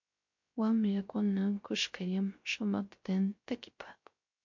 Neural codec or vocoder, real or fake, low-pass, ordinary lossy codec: codec, 16 kHz, 0.3 kbps, FocalCodec; fake; 7.2 kHz; MP3, 48 kbps